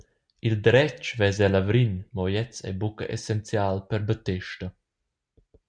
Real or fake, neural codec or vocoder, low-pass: real; none; 9.9 kHz